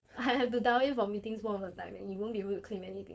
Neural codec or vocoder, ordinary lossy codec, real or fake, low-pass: codec, 16 kHz, 4.8 kbps, FACodec; none; fake; none